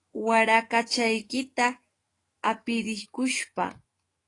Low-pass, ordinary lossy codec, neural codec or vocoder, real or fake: 10.8 kHz; AAC, 32 kbps; autoencoder, 48 kHz, 128 numbers a frame, DAC-VAE, trained on Japanese speech; fake